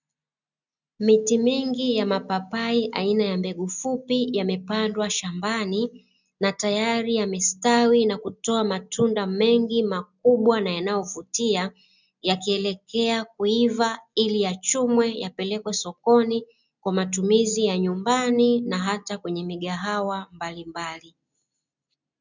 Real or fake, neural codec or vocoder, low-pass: real; none; 7.2 kHz